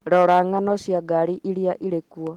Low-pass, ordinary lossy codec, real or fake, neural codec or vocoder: 19.8 kHz; Opus, 16 kbps; real; none